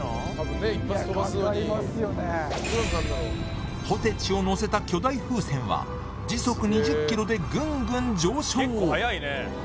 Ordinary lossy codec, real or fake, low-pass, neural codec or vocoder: none; real; none; none